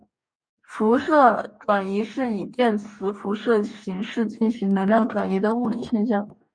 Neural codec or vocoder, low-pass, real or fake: codec, 44.1 kHz, 2.6 kbps, DAC; 9.9 kHz; fake